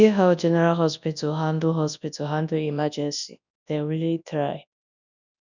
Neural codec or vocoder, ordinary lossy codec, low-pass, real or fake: codec, 24 kHz, 0.9 kbps, WavTokenizer, large speech release; none; 7.2 kHz; fake